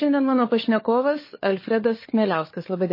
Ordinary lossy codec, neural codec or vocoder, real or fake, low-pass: MP3, 24 kbps; codec, 16 kHz, 4 kbps, FunCodec, trained on LibriTTS, 50 frames a second; fake; 5.4 kHz